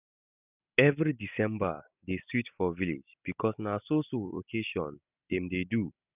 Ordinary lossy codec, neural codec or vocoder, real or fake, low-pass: none; none; real; 3.6 kHz